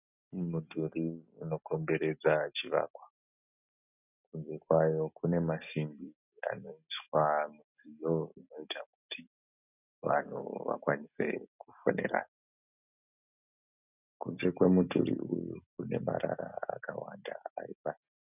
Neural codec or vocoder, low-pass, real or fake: none; 3.6 kHz; real